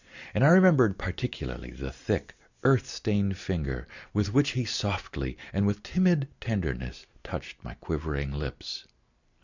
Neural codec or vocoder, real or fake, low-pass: none; real; 7.2 kHz